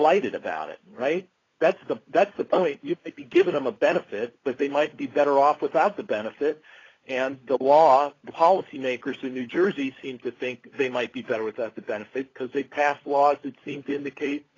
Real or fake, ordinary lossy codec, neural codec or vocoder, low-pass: fake; AAC, 32 kbps; codec, 16 kHz, 16 kbps, FunCodec, trained on LibriTTS, 50 frames a second; 7.2 kHz